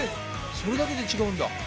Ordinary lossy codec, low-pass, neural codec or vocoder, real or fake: none; none; none; real